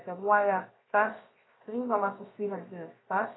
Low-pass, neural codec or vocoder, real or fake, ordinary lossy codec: 7.2 kHz; codec, 16 kHz, 0.7 kbps, FocalCodec; fake; AAC, 16 kbps